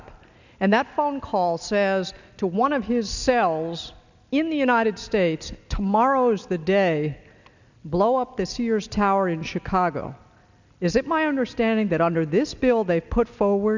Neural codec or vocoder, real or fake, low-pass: none; real; 7.2 kHz